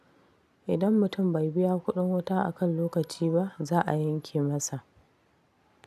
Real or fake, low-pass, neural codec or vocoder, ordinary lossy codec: real; 14.4 kHz; none; none